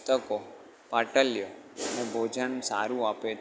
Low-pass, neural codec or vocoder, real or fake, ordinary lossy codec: none; none; real; none